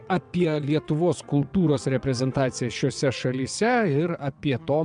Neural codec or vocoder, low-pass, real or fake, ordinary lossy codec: vocoder, 22.05 kHz, 80 mel bands, WaveNeXt; 9.9 kHz; fake; MP3, 96 kbps